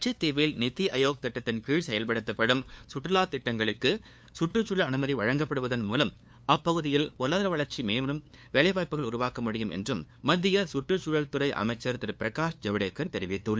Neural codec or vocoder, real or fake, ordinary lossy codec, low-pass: codec, 16 kHz, 2 kbps, FunCodec, trained on LibriTTS, 25 frames a second; fake; none; none